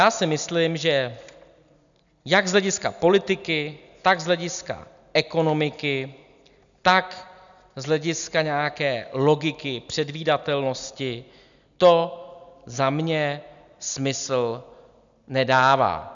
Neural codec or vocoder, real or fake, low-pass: none; real; 7.2 kHz